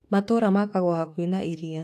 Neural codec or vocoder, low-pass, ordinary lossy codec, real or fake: autoencoder, 48 kHz, 32 numbers a frame, DAC-VAE, trained on Japanese speech; 14.4 kHz; AAC, 64 kbps; fake